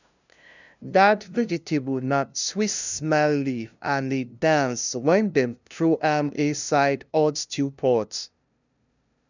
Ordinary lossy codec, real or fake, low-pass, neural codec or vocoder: none; fake; 7.2 kHz; codec, 16 kHz, 0.5 kbps, FunCodec, trained on LibriTTS, 25 frames a second